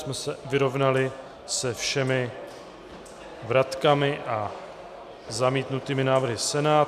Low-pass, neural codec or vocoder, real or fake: 14.4 kHz; none; real